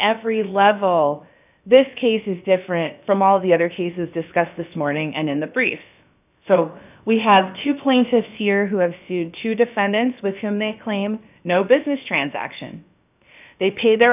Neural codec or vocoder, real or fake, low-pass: codec, 16 kHz, about 1 kbps, DyCAST, with the encoder's durations; fake; 3.6 kHz